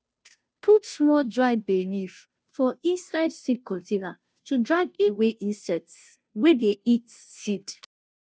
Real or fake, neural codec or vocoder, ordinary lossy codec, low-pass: fake; codec, 16 kHz, 0.5 kbps, FunCodec, trained on Chinese and English, 25 frames a second; none; none